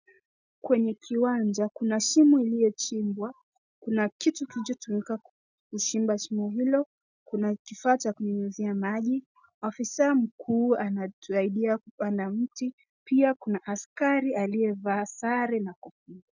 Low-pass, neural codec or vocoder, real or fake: 7.2 kHz; none; real